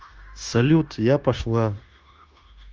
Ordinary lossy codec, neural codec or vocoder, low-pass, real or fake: Opus, 24 kbps; codec, 16 kHz, 0.9 kbps, LongCat-Audio-Codec; 7.2 kHz; fake